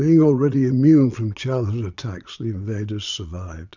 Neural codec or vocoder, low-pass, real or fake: vocoder, 22.05 kHz, 80 mel bands, Vocos; 7.2 kHz; fake